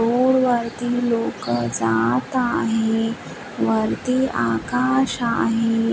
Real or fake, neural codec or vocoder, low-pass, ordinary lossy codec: real; none; none; none